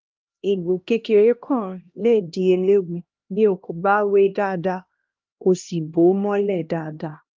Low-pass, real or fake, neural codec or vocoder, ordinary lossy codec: 7.2 kHz; fake; codec, 16 kHz, 1 kbps, X-Codec, HuBERT features, trained on LibriSpeech; Opus, 32 kbps